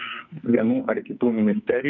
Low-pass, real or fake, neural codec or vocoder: 7.2 kHz; fake; codec, 44.1 kHz, 2.6 kbps, SNAC